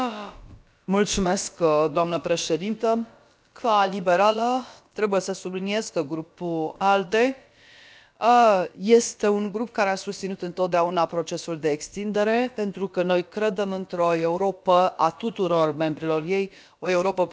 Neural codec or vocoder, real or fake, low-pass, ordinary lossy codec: codec, 16 kHz, about 1 kbps, DyCAST, with the encoder's durations; fake; none; none